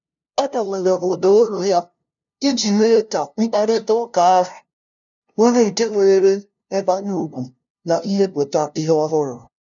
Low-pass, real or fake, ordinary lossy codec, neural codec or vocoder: 7.2 kHz; fake; none; codec, 16 kHz, 0.5 kbps, FunCodec, trained on LibriTTS, 25 frames a second